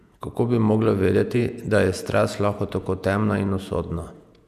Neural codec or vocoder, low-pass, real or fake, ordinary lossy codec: vocoder, 48 kHz, 128 mel bands, Vocos; 14.4 kHz; fake; none